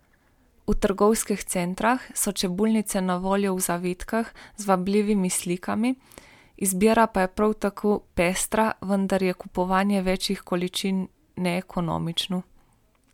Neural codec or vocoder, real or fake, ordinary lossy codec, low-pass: none; real; MP3, 96 kbps; 19.8 kHz